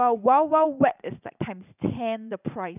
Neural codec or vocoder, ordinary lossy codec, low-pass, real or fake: vocoder, 44.1 kHz, 80 mel bands, Vocos; none; 3.6 kHz; fake